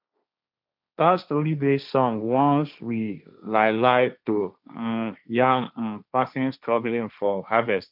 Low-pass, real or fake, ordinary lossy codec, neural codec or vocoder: 5.4 kHz; fake; none; codec, 16 kHz, 1.1 kbps, Voila-Tokenizer